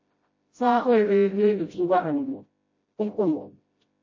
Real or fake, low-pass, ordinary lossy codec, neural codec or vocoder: fake; 7.2 kHz; MP3, 32 kbps; codec, 16 kHz, 0.5 kbps, FreqCodec, smaller model